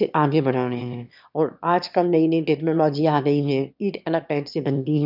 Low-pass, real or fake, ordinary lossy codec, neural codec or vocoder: 5.4 kHz; fake; none; autoencoder, 22.05 kHz, a latent of 192 numbers a frame, VITS, trained on one speaker